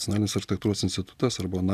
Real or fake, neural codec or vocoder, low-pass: real; none; 14.4 kHz